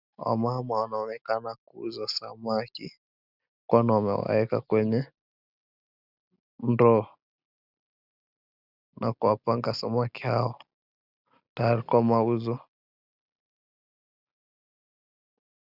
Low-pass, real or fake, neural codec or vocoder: 5.4 kHz; real; none